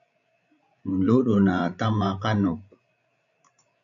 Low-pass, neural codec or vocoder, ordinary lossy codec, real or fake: 7.2 kHz; codec, 16 kHz, 16 kbps, FreqCodec, larger model; AAC, 64 kbps; fake